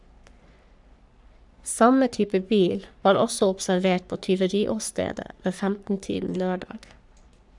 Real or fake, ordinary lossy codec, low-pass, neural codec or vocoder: fake; none; 10.8 kHz; codec, 44.1 kHz, 3.4 kbps, Pupu-Codec